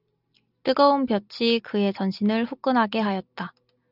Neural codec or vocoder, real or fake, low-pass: none; real; 5.4 kHz